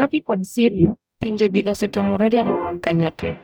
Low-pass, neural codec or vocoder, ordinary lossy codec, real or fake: none; codec, 44.1 kHz, 0.9 kbps, DAC; none; fake